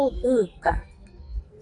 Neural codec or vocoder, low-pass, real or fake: codec, 44.1 kHz, 2.6 kbps, SNAC; 10.8 kHz; fake